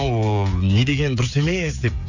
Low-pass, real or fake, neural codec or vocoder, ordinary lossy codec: 7.2 kHz; fake; codec, 16 kHz, 16 kbps, FreqCodec, smaller model; none